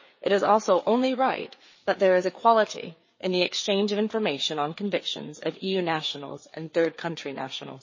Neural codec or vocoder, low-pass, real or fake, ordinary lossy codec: codec, 16 kHz, 4 kbps, FreqCodec, larger model; 7.2 kHz; fake; MP3, 32 kbps